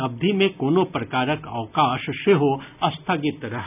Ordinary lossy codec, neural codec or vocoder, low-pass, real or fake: none; none; 3.6 kHz; real